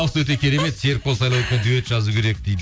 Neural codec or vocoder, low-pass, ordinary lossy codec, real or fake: none; none; none; real